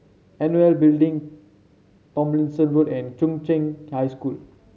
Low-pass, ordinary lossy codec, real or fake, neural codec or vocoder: none; none; real; none